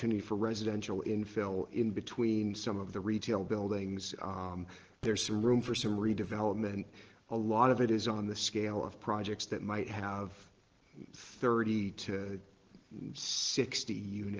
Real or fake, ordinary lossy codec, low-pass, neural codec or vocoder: real; Opus, 16 kbps; 7.2 kHz; none